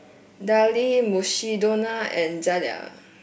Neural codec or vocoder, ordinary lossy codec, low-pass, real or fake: none; none; none; real